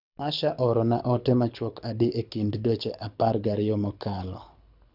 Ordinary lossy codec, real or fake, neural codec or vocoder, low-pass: none; fake; codec, 24 kHz, 6 kbps, HILCodec; 5.4 kHz